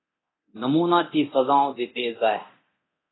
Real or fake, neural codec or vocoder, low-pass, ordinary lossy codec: fake; codec, 24 kHz, 0.9 kbps, DualCodec; 7.2 kHz; AAC, 16 kbps